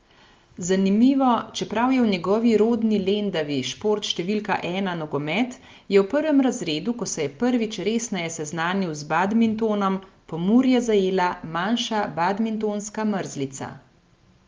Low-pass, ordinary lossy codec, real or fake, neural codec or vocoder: 7.2 kHz; Opus, 32 kbps; real; none